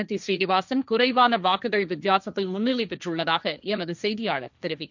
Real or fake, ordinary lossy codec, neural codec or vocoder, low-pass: fake; none; codec, 16 kHz, 1.1 kbps, Voila-Tokenizer; 7.2 kHz